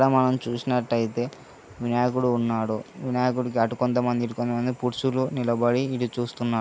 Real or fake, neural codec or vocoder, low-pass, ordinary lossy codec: real; none; none; none